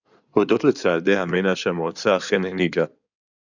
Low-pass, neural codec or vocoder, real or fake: 7.2 kHz; codec, 16 kHz in and 24 kHz out, 2.2 kbps, FireRedTTS-2 codec; fake